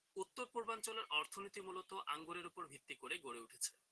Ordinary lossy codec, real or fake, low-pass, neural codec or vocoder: Opus, 16 kbps; real; 10.8 kHz; none